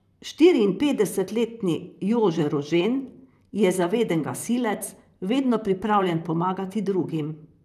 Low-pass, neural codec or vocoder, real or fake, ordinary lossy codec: 14.4 kHz; vocoder, 44.1 kHz, 128 mel bands, Pupu-Vocoder; fake; none